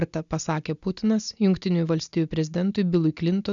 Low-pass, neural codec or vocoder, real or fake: 7.2 kHz; none; real